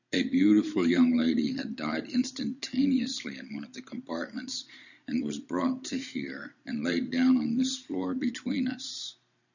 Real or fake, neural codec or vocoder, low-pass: fake; vocoder, 44.1 kHz, 128 mel bands every 512 samples, BigVGAN v2; 7.2 kHz